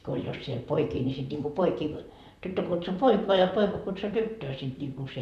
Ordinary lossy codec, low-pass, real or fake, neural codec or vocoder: none; 14.4 kHz; fake; vocoder, 44.1 kHz, 128 mel bands, Pupu-Vocoder